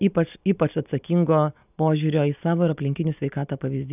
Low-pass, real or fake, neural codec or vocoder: 3.6 kHz; real; none